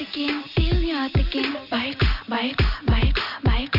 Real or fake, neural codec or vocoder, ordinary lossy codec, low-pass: real; none; none; 5.4 kHz